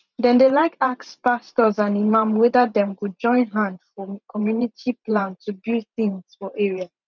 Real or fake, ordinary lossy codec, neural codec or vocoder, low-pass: fake; none; vocoder, 44.1 kHz, 128 mel bands every 512 samples, BigVGAN v2; 7.2 kHz